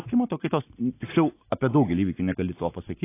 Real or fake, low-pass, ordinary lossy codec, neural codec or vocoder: real; 3.6 kHz; AAC, 24 kbps; none